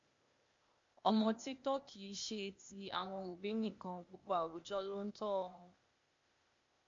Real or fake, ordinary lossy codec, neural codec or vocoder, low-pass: fake; MP3, 48 kbps; codec, 16 kHz, 0.8 kbps, ZipCodec; 7.2 kHz